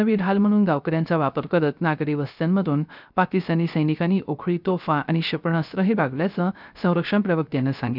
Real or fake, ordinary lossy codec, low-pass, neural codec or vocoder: fake; none; 5.4 kHz; codec, 16 kHz, 0.3 kbps, FocalCodec